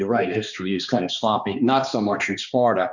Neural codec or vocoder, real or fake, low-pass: codec, 16 kHz, 2 kbps, X-Codec, HuBERT features, trained on balanced general audio; fake; 7.2 kHz